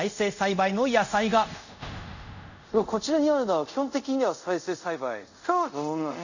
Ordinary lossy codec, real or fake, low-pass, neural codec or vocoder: none; fake; 7.2 kHz; codec, 24 kHz, 0.5 kbps, DualCodec